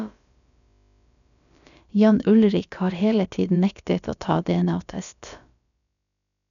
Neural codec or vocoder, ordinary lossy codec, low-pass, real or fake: codec, 16 kHz, about 1 kbps, DyCAST, with the encoder's durations; none; 7.2 kHz; fake